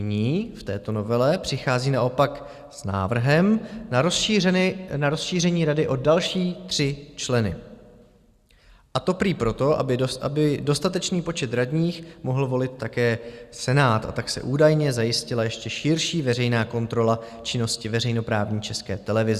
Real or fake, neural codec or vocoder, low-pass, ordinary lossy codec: real; none; 14.4 kHz; Opus, 64 kbps